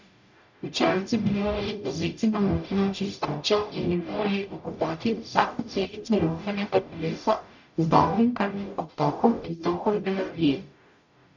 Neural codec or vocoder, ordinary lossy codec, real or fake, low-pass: codec, 44.1 kHz, 0.9 kbps, DAC; none; fake; 7.2 kHz